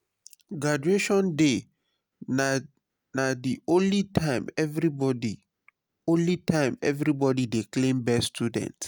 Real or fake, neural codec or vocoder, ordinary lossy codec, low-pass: real; none; none; none